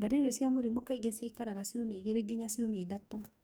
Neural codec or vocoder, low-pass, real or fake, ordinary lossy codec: codec, 44.1 kHz, 2.6 kbps, DAC; none; fake; none